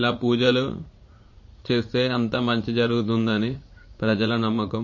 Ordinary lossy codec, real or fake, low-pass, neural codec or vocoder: MP3, 32 kbps; fake; 7.2 kHz; vocoder, 44.1 kHz, 80 mel bands, Vocos